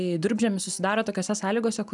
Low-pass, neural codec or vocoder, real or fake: 10.8 kHz; none; real